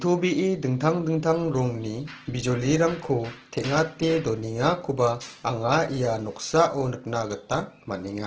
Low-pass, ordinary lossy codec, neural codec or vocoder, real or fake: 7.2 kHz; Opus, 16 kbps; none; real